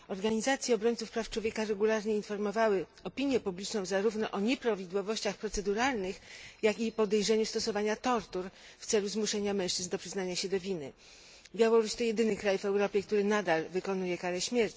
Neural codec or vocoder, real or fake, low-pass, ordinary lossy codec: none; real; none; none